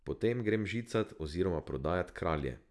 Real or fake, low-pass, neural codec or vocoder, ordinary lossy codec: real; none; none; none